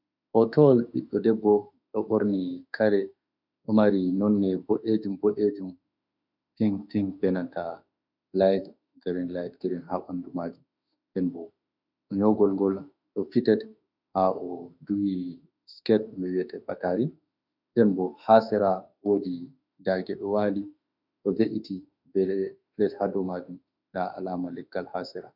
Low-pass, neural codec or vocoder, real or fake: 5.4 kHz; autoencoder, 48 kHz, 32 numbers a frame, DAC-VAE, trained on Japanese speech; fake